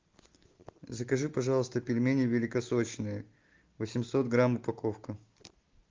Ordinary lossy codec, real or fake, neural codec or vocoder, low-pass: Opus, 24 kbps; real; none; 7.2 kHz